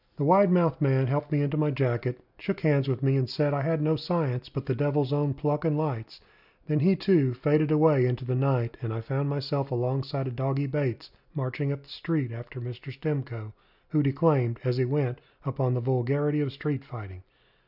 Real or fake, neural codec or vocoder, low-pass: real; none; 5.4 kHz